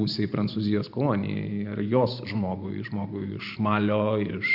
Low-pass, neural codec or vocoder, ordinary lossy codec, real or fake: 5.4 kHz; vocoder, 44.1 kHz, 128 mel bands every 512 samples, BigVGAN v2; AAC, 48 kbps; fake